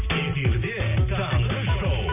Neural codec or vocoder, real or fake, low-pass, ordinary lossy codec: none; real; 3.6 kHz; none